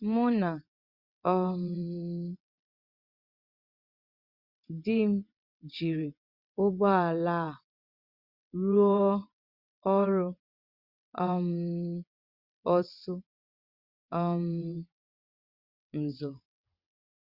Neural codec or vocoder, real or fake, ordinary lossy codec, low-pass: vocoder, 22.05 kHz, 80 mel bands, WaveNeXt; fake; Opus, 64 kbps; 5.4 kHz